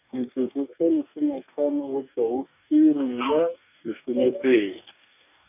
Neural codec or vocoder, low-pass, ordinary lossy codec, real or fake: codec, 44.1 kHz, 2.6 kbps, DAC; 3.6 kHz; none; fake